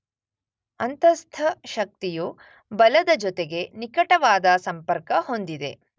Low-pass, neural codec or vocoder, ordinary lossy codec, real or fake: none; none; none; real